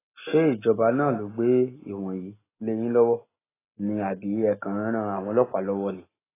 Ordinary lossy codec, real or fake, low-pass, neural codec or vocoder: AAC, 16 kbps; real; 3.6 kHz; none